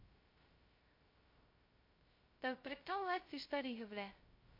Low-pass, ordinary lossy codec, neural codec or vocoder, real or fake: 5.4 kHz; MP3, 32 kbps; codec, 16 kHz, 0.2 kbps, FocalCodec; fake